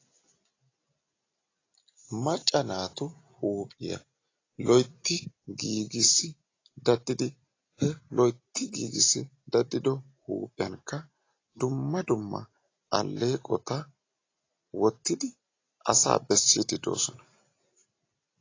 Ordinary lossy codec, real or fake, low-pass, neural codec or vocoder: AAC, 32 kbps; real; 7.2 kHz; none